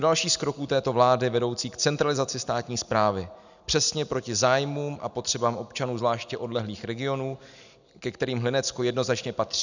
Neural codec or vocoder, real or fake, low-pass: none; real; 7.2 kHz